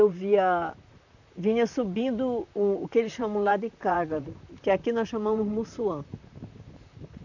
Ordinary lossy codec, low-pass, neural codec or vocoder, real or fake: none; 7.2 kHz; vocoder, 44.1 kHz, 128 mel bands, Pupu-Vocoder; fake